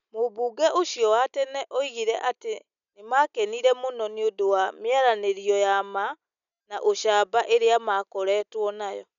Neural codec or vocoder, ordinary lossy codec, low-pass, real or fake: none; none; 7.2 kHz; real